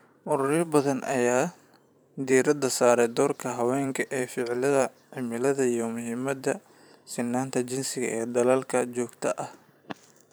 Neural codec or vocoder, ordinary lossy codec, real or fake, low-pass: vocoder, 44.1 kHz, 128 mel bands every 512 samples, BigVGAN v2; none; fake; none